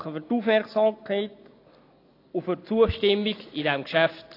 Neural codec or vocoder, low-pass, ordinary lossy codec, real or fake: none; 5.4 kHz; AAC, 32 kbps; real